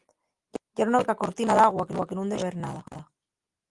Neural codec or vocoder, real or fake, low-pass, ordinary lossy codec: none; real; 10.8 kHz; Opus, 24 kbps